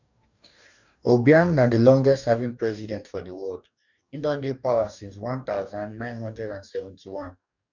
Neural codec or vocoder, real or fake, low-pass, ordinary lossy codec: codec, 44.1 kHz, 2.6 kbps, DAC; fake; 7.2 kHz; none